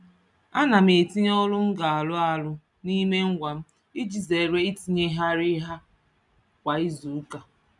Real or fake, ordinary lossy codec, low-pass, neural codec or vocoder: real; none; 10.8 kHz; none